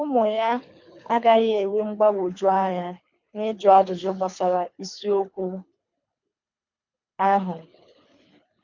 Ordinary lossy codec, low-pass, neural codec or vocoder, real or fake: MP3, 48 kbps; 7.2 kHz; codec, 24 kHz, 3 kbps, HILCodec; fake